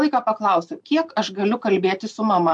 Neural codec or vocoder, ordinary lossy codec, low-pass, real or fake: none; MP3, 64 kbps; 10.8 kHz; real